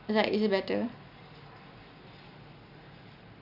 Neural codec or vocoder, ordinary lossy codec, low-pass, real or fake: none; none; 5.4 kHz; real